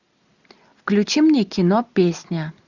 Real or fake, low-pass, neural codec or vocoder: real; 7.2 kHz; none